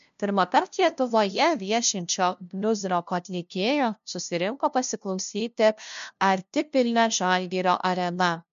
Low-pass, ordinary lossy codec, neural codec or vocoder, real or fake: 7.2 kHz; MP3, 64 kbps; codec, 16 kHz, 0.5 kbps, FunCodec, trained on LibriTTS, 25 frames a second; fake